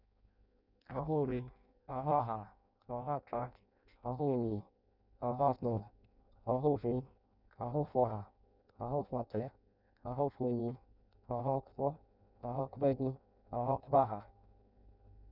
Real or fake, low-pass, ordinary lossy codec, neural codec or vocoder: fake; 5.4 kHz; none; codec, 16 kHz in and 24 kHz out, 0.6 kbps, FireRedTTS-2 codec